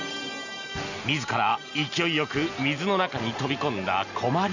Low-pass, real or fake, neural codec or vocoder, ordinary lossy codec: 7.2 kHz; real; none; none